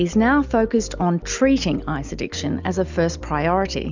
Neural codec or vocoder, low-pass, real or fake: none; 7.2 kHz; real